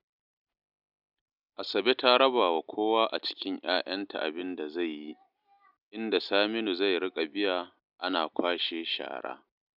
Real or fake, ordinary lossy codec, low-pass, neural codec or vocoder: real; none; 5.4 kHz; none